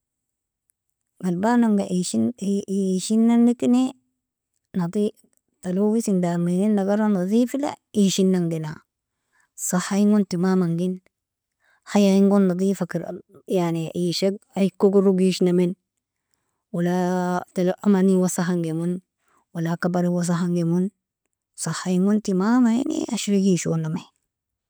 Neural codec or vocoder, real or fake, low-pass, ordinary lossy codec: none; real; none; none